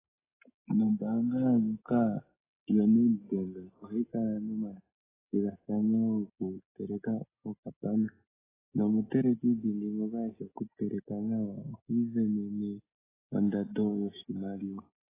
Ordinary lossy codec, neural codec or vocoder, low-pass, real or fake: AAC, 16 kbps; none; 3.6 kHz; real